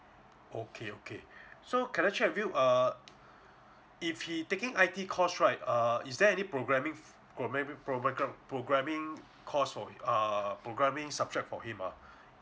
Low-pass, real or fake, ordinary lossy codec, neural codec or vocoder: none; real; none; none